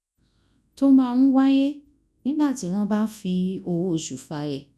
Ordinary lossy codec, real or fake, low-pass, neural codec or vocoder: none; fake; none; codec, 24 kHz, 0.9 kbps, WavTokenizer, large speech release